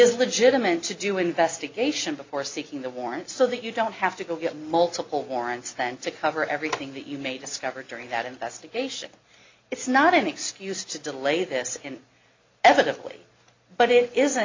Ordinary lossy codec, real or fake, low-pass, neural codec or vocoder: MP3, 64 kbps; real; 7.2 kHz; none